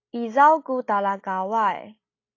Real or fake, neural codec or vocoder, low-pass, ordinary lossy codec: real; none; 7.2 kHz; AAC, 32 kbps